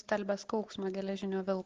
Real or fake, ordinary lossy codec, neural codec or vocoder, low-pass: real; Opus, 16 kbps; none; 7.2 kHz